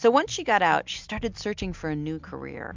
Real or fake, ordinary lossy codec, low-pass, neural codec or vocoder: real; MP3, 64 kbps; 7.2 kHz; none